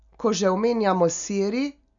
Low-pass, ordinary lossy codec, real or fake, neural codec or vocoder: 7.2 kHz; none; real; none